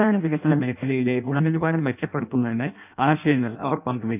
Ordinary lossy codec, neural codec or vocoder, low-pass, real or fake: none; codec, 16 kHz in and 24 kHz out, 0.6 kbps, FireRedTTS-2 codec; 3.6 kHz; fake